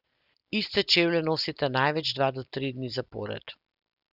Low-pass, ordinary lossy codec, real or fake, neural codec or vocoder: 5.4 kHz; Opus, 64 kbps; real; none